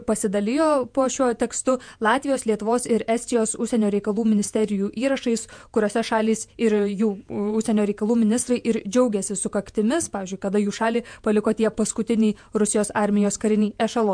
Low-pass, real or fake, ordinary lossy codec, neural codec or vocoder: 9.9 kHz; fake; MP3, 64 kbps; vocoder, 48 kHz, 128 mel bands, Vocos